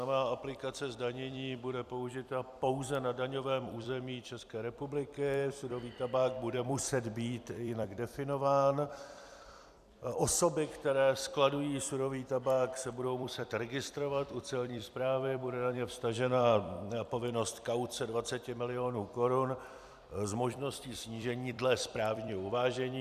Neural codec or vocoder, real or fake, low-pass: vocoder, 44.1 kHz, 128 mel bands every 256 samples, BigVGAN v2; fake; 14.4 kHz